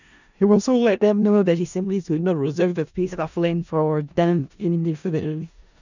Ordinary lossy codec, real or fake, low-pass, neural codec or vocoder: none; fake; 7.2 kHz; codec, 16 kHz in and 24 kHz out, 0.4 kbps, LongCat-Audio-Codec, four codebook decoder